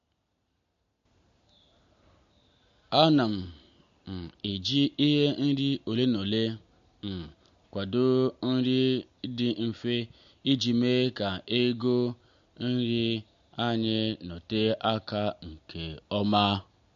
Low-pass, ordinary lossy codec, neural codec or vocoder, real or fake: 7.2 kHz; MP3, 48 kbps; none; real